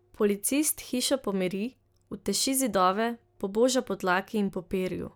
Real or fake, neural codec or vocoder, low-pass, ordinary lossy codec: real; none; none; none